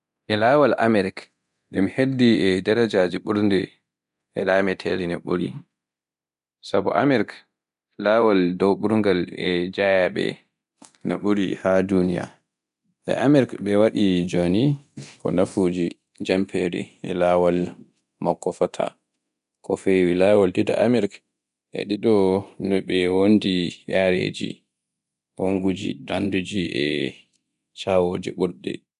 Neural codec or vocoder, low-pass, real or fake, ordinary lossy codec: codec, 24 kHz, 0.9 kbps, DualCodec; 10.8 kHz; fake; none